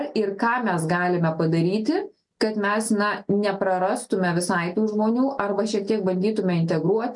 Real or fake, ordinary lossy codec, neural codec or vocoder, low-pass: real; MP3, 64 kbps; none; 10.8 kHz